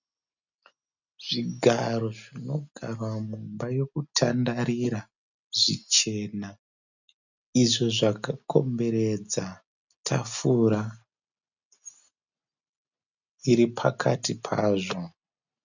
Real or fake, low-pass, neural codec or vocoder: real; 7.2 kHz; none